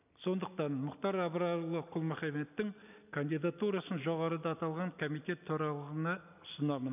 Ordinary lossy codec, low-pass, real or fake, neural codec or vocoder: none; 3.6 kHz; real; none